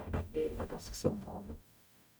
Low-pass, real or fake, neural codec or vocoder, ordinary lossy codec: none; fake; codec, 44.1 kHz, 0.9 kbps, DAC; none